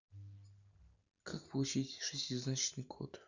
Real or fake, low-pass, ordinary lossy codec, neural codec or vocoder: real; 7.2 kHz; none; none